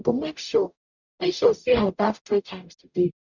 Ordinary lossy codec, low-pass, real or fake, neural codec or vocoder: none; 7.2 kHz; fake; codec, 44.1 kHz, 0.9 kbps, DAC